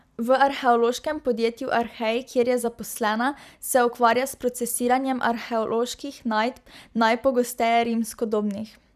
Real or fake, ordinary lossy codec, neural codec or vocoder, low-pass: fake; none; vocoder, 44.1 kHz, 128 mel bands every 256 samples, BigVGAN v2; 14.4 kHz